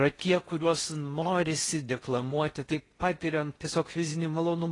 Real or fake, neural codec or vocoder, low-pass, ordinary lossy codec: fake; codec, 16 kHz in and 24 kHz out, 0.6 kbps, FocalCodec, streaming, 4096 codes; 10.8 kHz; AAC, 32 kbps